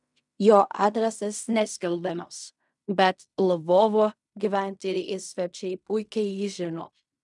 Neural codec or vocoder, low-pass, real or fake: codec, 16 kHz in and 24 kHz out, 0.4 kbps, LongCat-Audio-Codec, fine tuned four codebook decoder; 10.8 kHz; fake